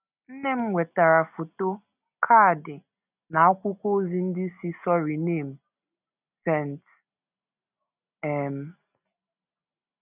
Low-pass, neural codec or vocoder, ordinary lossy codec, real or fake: 3.6 kHz; none; none; real